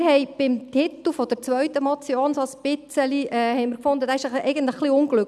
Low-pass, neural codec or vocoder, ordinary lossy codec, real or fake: none; none; none; real